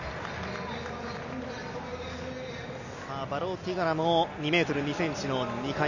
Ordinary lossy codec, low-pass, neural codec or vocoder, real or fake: none; 7.2 kHz; none; real